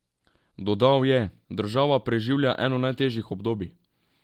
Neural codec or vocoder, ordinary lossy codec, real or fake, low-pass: none; Opus, 24 kbps; real; 19.8 kHz